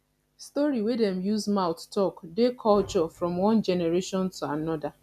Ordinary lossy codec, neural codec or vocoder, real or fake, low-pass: none; none; real; 14.4 kHz